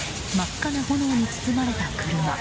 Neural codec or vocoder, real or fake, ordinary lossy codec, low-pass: none; real; none; none